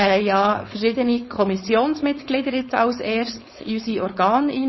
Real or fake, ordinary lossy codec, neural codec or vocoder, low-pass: fake; MP3, 24 kbps; codec, 16 kHz, 4.8 kbps, FACodec; 7.2 kHz